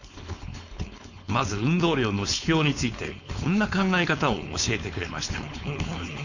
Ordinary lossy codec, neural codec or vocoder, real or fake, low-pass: none; codec, 16 kHz, 4.8 kbps, FACodec; fake; 7.2 kHz